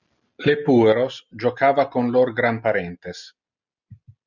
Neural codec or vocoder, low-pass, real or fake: none; 7.2 kHz; real